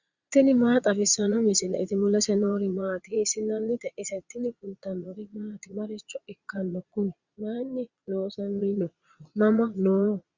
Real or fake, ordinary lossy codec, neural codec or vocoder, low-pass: fake; Opus, 64 kbps; vocoder, 22.05 kHz, 80 mel bands, Vocos; 7.2 kHz